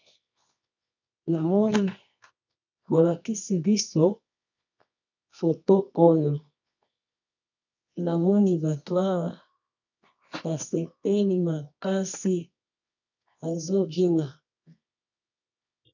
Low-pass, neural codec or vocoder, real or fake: 7.2 kHz; codec, 24 kHz, 0.9 kbps, WavTokenizer, medium music audio release; fake